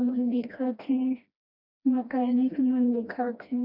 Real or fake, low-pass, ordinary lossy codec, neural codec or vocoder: fake; 5.4 kHz; none; codec, 16 kHz, 1 kbps, FreqCodec, smaller model